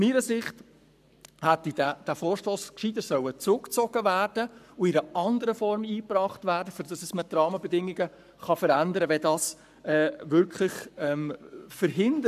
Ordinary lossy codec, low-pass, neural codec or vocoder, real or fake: none; 14.4 kHz; codec, 44.1 kHz, 7.8 kbps, Pupu-Codec; fake